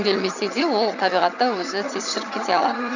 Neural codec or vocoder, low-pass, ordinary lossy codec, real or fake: vocoder, 22.05 kHz, 80 mel bands, HiFi-GAN; 7.2 kHz; none; fake